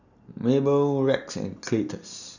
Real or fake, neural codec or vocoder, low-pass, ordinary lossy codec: real; none; 7.2 kHz; none